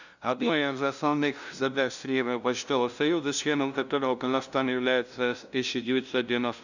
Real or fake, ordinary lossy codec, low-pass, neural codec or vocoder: fake; none; 7.2 kHz; codec, 16 kHz, 0.5 kbps, FunCodec, trained on LibriTTS, 25 frames a second